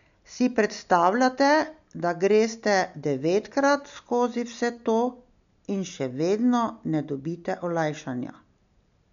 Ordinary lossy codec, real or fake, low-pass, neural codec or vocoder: none; real; 7.2 kHz; none